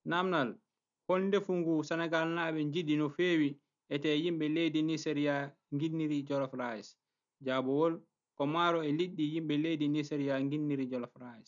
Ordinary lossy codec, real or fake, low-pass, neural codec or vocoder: none; real; 7.2 kHz; none